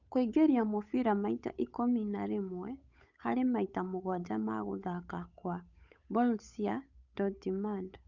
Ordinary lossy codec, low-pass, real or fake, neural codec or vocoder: none; 7.2 kHz; fake; codec, 16 kHz, 8 kbps, FunCodec, trained on Chinese and English, 25 frames a second